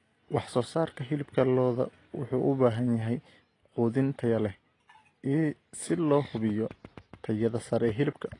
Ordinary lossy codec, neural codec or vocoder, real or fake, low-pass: AAC, 32 kbps; none; real; 10.8 kHz